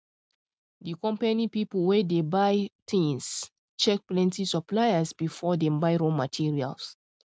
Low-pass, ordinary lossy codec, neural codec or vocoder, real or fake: none; none; none; real